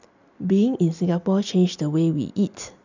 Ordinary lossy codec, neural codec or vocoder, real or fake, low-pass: none; none; real; 7.2 kHz